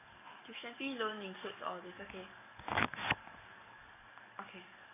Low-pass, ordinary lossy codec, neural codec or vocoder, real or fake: 3.6 kHz; none; codec, 44.1 kHz, 7.8 kbps, DAC; fake